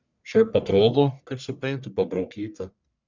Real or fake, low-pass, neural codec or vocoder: fake; 7.2 kHz; codec, 44.1 kHz, 1.7 kbps, Pupu-Codec